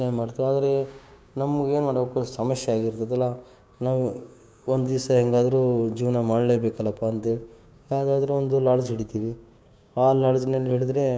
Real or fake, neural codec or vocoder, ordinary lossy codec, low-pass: fake; codec, 16 kHz, 6 kbps, DAC; none; none